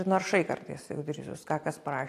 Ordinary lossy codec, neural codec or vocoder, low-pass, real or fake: AAC, 64 kbps; none; 14.4 kHz; real